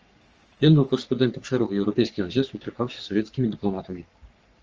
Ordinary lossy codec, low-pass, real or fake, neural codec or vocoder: Opus, 24 kbps; 7.2 kHz; fake; codec, 44.1 kHz, 3.4 kbps, Pupu-Codec